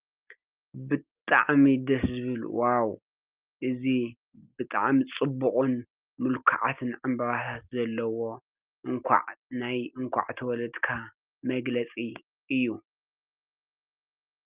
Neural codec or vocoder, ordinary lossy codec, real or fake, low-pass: none; Opus, 32 kbps; real; 3.6 kHz